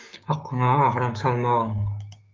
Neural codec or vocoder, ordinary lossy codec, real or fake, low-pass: codec, 16 kHz, 6 kbps, DAC; Opus, 32 kbps; fake; 7.2 kHz